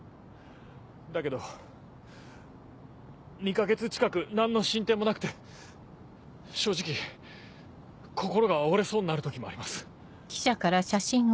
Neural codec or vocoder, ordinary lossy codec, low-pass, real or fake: none; none; none; real